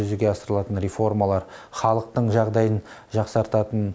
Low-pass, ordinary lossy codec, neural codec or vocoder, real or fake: none; none; none; real